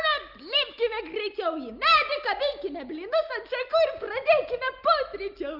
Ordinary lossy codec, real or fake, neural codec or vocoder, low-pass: Opus, 24 kbps; real; none; 5.4 kHz